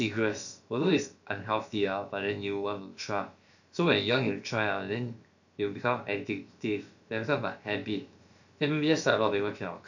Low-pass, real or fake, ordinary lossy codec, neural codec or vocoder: 7.2 kHz; fake; none; codec, 16 kHz, about 1 kbps, DyCAST, with the encoder's durations